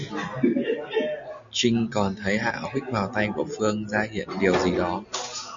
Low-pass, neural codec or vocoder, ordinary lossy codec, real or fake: 7.2 kHz; none; MP3, 64 kbps; real